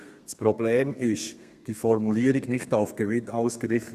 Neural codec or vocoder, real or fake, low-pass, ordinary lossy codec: codec, 32 kHz, 1.9 kbps, SNAC; fake; 14.4 kHz; Opus, 64 kbps